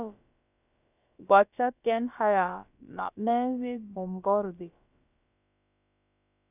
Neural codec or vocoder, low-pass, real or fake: codec, 16 kHz, about 1 kbps, DyCAST, with the encoder's durations; 3.6 kHz; fake